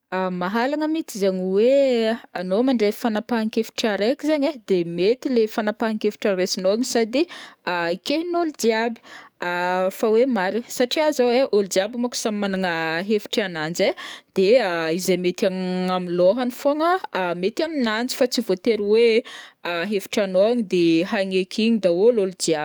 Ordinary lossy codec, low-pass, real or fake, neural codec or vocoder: none; none; fake; codec, 44.1 kHz, 7.8 kbps, DAC